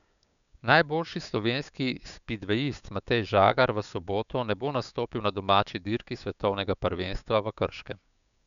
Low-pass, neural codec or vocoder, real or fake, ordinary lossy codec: 7.2 kHz; codec, 16 kHz, 6 kbps, DAC; fake; none